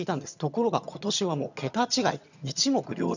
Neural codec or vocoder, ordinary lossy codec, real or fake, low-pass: vocoder, 22.05 kHz, 80 mel bands, HiFi-GAN; none; fake; 7.2 kHz